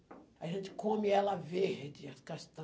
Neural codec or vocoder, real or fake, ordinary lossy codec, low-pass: none; real; none; none